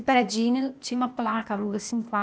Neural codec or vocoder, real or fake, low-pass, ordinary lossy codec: codec, 16 kHz, 0.8 kbps, ZipCodec; fake; none; none